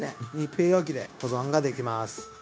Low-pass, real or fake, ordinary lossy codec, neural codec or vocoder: none; fake; none; codec, 16 kHz, 0.9 kbps, LongCat-Audio-Codec